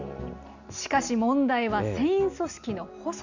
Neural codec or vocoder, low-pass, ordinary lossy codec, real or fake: none; 7.2 kHz; none; real